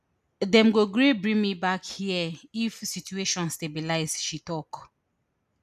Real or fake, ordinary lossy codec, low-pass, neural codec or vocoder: real; none; 14.4 kHz; none